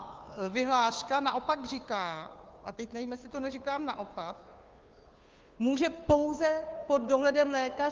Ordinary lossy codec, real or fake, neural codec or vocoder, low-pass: Opus, 32 kbps; fake; codec, 16 kHz, 2 kbps, FunCodec, trained on Chinese and English, 25 frames a second; 7.2 kHz